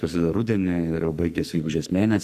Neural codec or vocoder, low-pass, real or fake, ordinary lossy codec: codec, 44.1 kHz, 2.6 kbps, SNAC; 14.4 kHz; fake; AAC, 96 kbps